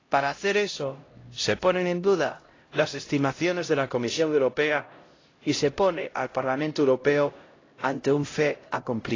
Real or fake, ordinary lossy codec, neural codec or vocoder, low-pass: fake; AAC, 32 kbps; codec, 16 kHz, 0.5 kbps, X-Codec, HuBERT features, trained on LibriSpeech; 7.2 kHz